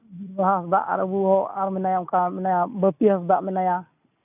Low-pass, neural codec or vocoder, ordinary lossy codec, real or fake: 3.6 kHz; none; none; real